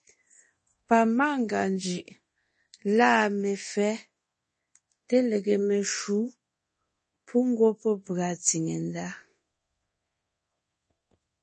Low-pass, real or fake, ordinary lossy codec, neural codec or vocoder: 10.8 kHz; fake; MP3, 32 kbps; codec, 24 kHz, 0.9 kbps, DualCodec